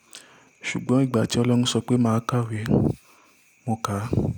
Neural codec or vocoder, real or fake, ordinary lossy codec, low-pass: none; real; none; 19.8 kHz